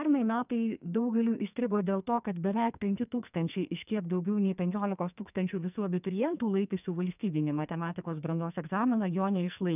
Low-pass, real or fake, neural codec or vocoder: 3.6 kHz; fake; codec, 44.1 kHz, 2.6 kbps, SNAC